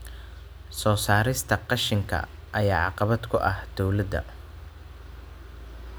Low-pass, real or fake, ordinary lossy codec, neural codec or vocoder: none; real; none; none